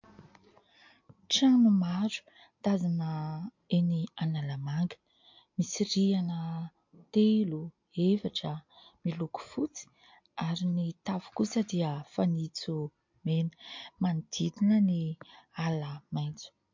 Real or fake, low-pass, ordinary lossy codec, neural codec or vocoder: real; 7.2 kHz; MP3, 48 kbps; none